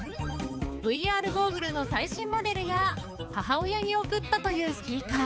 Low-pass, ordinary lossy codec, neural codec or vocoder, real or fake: none; none; codec, 16 kHz, 4 kbps, X-Codec, HuBERT features, trained on balanced general audio; fake